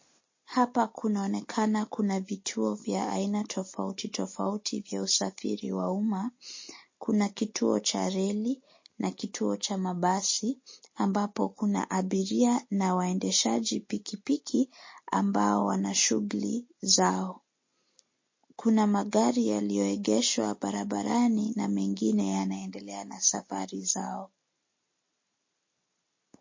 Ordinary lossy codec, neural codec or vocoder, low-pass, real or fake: MP3, 32 kbps; none; 7.2 kHz; real